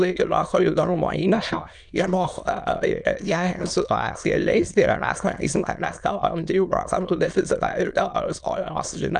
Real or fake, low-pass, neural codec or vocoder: fake; 9.9 kHz; autoencoder, 22.05 kHz, a latent of 192 numbers a frame, VITS, trained on many speakers